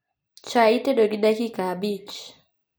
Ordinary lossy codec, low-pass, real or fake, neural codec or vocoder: none; none; real; none